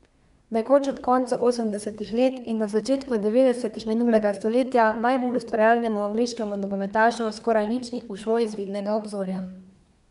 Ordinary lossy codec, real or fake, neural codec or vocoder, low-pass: none; fake; codec, 24 kHz, 1 kbps, SNAC; 10.8 kHz